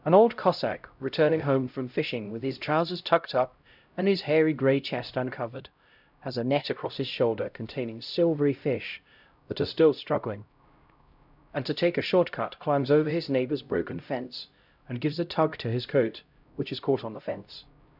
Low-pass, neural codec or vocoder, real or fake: 5.4 kHz; codec, 16 kHz, 0.5 kbps, X-Codec, HuBERT features, trained on LibriSpeech; fake